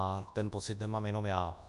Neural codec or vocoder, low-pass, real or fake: codec, 24 kHz, 0.9 kbps, WavTokenizer, large speech release; 10.8 kHz; fake